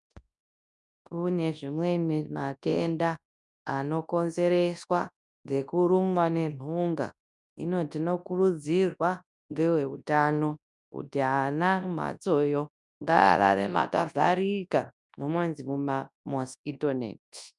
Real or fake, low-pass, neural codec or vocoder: fake; 10.8 kHz; codec, 24 kHz, 0.9 kbps, WavTokenizer, large speech release